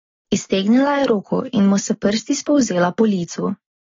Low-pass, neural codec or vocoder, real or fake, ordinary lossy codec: 7.2 kHz; none; real; AAC, 24 kbps